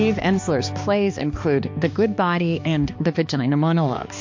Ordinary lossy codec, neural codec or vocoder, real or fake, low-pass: MP3, 48 kbps; codec, 16 kHz, 2 kbps, X-Codec, HuBERT features, trained on balanced general audio; fake; 7.2 kHz